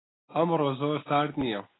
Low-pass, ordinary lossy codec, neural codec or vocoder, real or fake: 7.2 kHz; AAC, 16 kbps; none; real